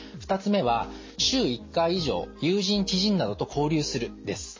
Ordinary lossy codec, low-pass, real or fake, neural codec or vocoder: MP3, 32 kbps; 7.2 kHz; real; none